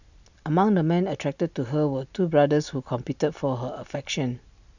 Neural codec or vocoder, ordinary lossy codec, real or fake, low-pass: none; none; real; 7.2 kHz